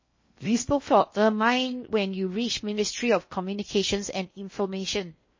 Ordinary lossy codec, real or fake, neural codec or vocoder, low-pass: MP3, 32 kbps; fake; codec, 16 kHz in and 24 kHz out, 0.8 kbps, FocalCodec, streaming, 65536 codes; 7.2 kHz